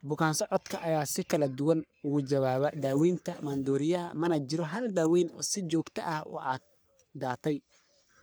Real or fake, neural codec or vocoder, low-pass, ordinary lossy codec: fake; codec, 44.1 kHz, 3.4 kbps, Pupu-Codec; none; none